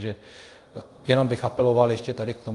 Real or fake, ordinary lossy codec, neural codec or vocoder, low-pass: fake; Opus, 32 kbps; codec, 24 kHz, 0.5 kbps, DualCodec; 10.8 kHz